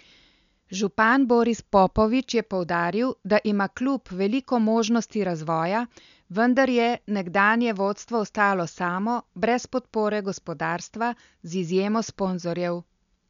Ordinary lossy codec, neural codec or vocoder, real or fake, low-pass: none; none; real; 7.2 kHz